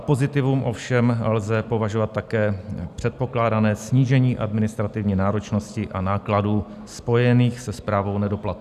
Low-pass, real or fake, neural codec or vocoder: 14.4 kHz; real; none